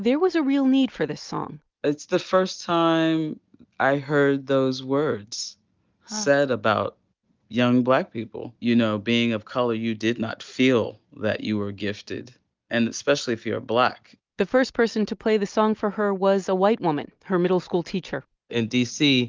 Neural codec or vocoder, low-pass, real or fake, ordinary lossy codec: none; 7.2 kHz; real; Opus, 24 kbps